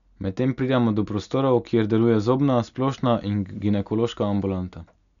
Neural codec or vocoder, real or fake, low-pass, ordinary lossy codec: none; real; 7.2 kHz; none